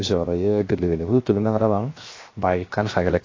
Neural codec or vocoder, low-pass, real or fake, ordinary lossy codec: codec, 16 kHz, 0.7 kbps, FocalCodec; 7.2 kHz; fake; AAC, 32 kbps